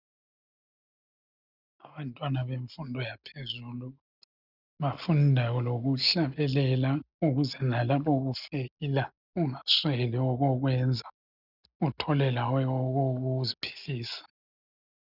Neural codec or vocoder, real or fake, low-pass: none; real; 5.4 kHz